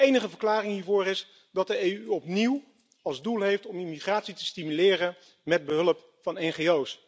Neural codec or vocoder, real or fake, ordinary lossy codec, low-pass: none; real; none; none